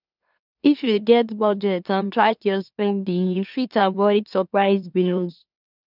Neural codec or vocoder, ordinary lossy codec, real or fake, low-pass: autoencoder, 44.1 kHz, a latent of 192 numbers a frame, MeloTTS; none; fake; 5.4 kHz